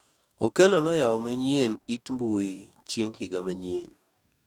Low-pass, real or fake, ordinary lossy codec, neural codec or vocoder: 19.8 kHz; fake; none; codec, 44.1 kHz, 2.6 kbps, DAC